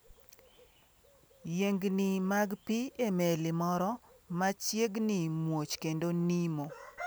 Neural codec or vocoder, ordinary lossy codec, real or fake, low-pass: none; none; real; none